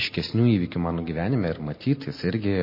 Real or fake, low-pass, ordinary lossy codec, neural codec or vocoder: real; 5.4 kHz; MP3, 24 kbps; none